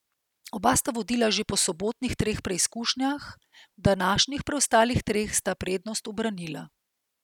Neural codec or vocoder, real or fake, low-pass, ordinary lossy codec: none; real; 19.8 kHz; none